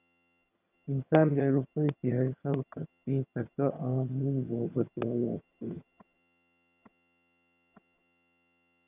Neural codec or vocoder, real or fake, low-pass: vocoder, 22.05 kHz, 80 mel bands, HiFi-GAN; fake; 3.6 kHz